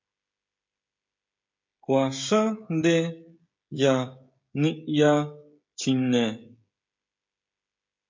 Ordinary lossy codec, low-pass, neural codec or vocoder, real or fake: MP3, 48 kbps; 7.2 kHz; codec, 16 kHz, 16 kbps, FreqCodec, smaller model; fake